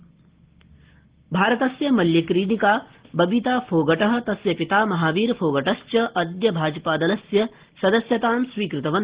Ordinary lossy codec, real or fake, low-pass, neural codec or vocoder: Opus, 16 kbps; real; 3.6 kHz; none